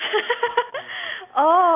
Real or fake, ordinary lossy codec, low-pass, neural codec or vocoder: real; AAC, 32 kbps; 3.6 kHz; none